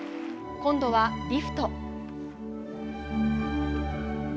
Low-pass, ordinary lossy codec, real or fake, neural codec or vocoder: none; none; real; none